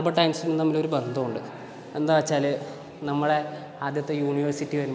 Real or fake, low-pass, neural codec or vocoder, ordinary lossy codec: real; none; none; none